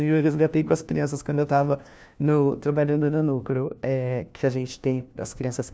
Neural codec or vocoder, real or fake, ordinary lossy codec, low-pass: codec, 16 kHz, 1 kbps, FunCodec, trained on LibriTTS, 50 frames a second; fake; none; none